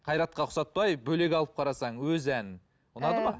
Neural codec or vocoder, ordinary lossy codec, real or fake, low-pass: none; none; real; none